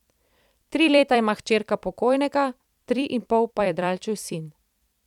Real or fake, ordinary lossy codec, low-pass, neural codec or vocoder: fake; none; 19.8 kHz; vocoder, 44.1 kHz, 128 mel bands every 256 samples, BigVGAN v2